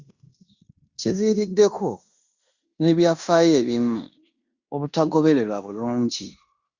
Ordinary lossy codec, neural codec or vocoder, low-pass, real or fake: Opus, 64 kbps; codec, 16 kHz in and 24 kHz out, 0.9 kbps, LongCat-Audio-Codec, fine tuned four codebook decoder; 7.2 kHz; fake